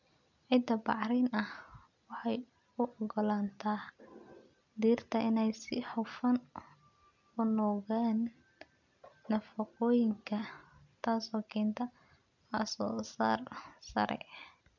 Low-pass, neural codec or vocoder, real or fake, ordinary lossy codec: 7.2 kHz; none; real; none